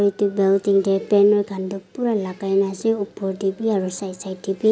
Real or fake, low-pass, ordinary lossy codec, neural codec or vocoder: real; none; none; none